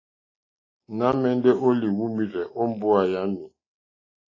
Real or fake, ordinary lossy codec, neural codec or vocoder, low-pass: real; AAC, 32 kbps; none; 7.2 kHz